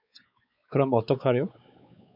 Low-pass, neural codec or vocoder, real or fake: 5.4 kHz; codec, 16 kHz, 4 kbps, X-Codec, WavLM features, trained on Multilingual LibriSpeech; fake